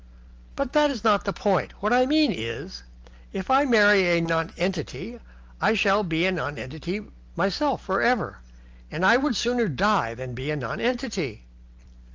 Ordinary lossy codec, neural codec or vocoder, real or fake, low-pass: Opus, 24 kbps; none; real; 7.2 kHz